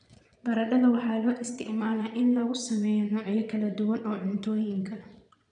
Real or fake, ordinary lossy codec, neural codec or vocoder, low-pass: fake; none; vocoder, 22.05 kHz, 80 mel bands, Vocos; 9.9 kHz